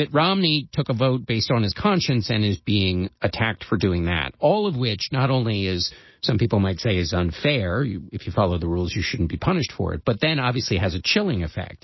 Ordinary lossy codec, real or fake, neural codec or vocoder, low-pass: MP3, 24 kbps; real; none; 7.2 kHz